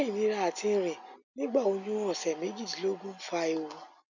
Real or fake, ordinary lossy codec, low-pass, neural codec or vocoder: real; none; 7.2 kHz; none